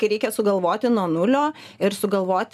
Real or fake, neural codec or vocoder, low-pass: real; none; 14.4 kHz